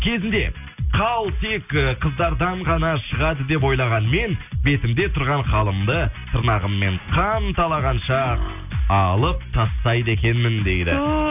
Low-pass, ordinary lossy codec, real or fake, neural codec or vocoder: 3.6 kHz; none; real; none